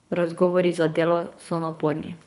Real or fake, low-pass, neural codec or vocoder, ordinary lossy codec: fake; 10.8 kHz; codec, 24 kHz, 3 kbps, HILCodec; none